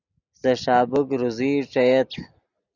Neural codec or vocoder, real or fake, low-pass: none; real; 7.2 kHz